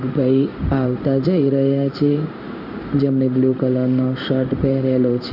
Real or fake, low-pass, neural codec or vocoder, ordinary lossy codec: fake; 5.4 kHz; codec, 16 kHz in and 24 kHz out, 1 kbps, XY-Tokenizer; AAC, 48 kbps